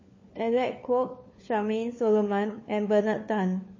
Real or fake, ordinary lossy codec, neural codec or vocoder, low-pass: fake; MP3, 32 kbps; codec, 16 kHz, 4 kbps, FunCodec, trained on LibriTTS, 50 frames a second; 7.2 kHz